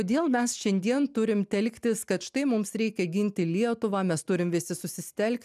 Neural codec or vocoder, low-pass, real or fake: none; 14.4 kHz; real